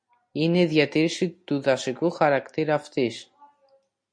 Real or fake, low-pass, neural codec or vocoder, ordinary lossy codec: real; 9.9 kHz; none; MP3, 48 kbps